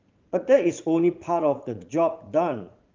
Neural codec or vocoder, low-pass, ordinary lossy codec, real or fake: vocoder, 44.1 kHz, 80 mel bands, Vocos; 7.2 kHz; Opus, 32 kbps; fake